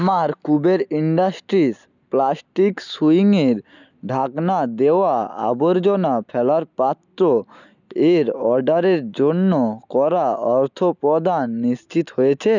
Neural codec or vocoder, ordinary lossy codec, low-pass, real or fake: none; none; 7.2 kHz; real